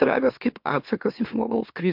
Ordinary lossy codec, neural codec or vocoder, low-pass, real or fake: MP3, 48 kbps; autoencoder, 44.1 kHz, a latent of 192 numbers a frame, MeloTTS; 5.4 kHz; fake